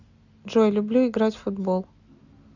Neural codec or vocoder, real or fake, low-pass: none; real; 7.2 kHz